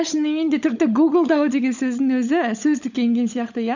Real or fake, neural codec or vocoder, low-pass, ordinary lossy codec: fake; codec, 16 kHz, 16 kbps, FunCodec, trained on LibriTTS, 50 frames a second; 7.2 kHz; none